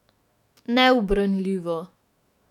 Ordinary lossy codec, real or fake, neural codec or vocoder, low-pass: none; fake; autoencoder, 48 kHz, 128 numbers a frame, DAC-VAE, trained on Japanese speech; 19.8 kHz